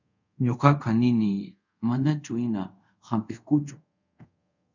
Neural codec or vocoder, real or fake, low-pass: codec, 24 kHz, 0.5 kbps, DualCodec; fake; 7.2 kHz